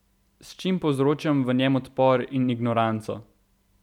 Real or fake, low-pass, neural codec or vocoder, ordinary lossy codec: real; 19.8 kHz; none; none